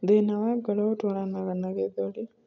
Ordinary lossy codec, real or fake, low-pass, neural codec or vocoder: none; real; 7.2 kHz; none